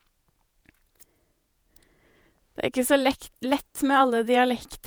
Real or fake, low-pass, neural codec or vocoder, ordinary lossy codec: fake; none; vocoder, 48 kHz, 128 mel bands, Vocos; none